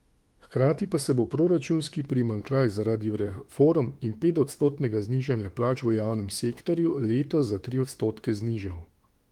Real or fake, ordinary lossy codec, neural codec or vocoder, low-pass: fake; Opus, 24 kbps; autoencoder, 48 kHz, 32 numbers a frame, DAC-VAE, trained on Japanese speech; 19.8 kHz